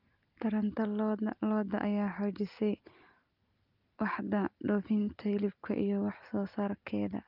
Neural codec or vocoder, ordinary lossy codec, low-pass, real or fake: none; Opus, 32 kbps; 5.4 kHz; real